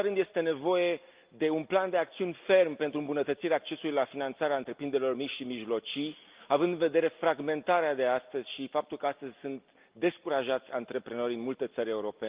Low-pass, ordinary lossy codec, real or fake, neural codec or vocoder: 3.6 kHz; Opus, 32 kbps; real; none